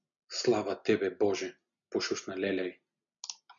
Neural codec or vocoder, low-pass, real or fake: none; 7.2 kHz; real